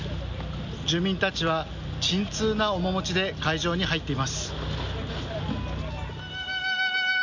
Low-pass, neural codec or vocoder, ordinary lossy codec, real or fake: 7.2 kHz; none; none; real